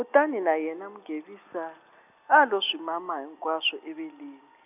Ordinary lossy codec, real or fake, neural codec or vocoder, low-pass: none; real; none; 3.6 kHz